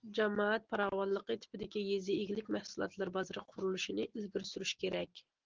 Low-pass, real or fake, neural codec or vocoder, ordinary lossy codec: 7.2 kHz; real; none; Opus, 16 kbps